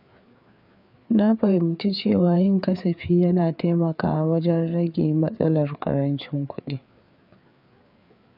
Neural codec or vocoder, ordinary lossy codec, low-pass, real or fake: codec, 16 kHz, 4 kbps, FreqCodec, larger model; none; 5.4 kHz; fake